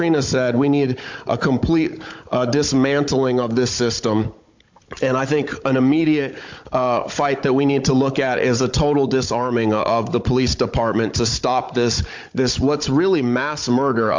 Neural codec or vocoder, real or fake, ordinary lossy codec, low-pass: codec, 16 kHz, 16 kbps, FunCodec, trained on Chinese and English, 50 frames a second; fake; MP3, 48 kbps; 7.2 kHz